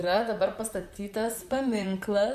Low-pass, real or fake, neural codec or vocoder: 14.4 kHz; fake; vocoder, 44.1 kHz, 128 mel bands, Pupu-Vocoder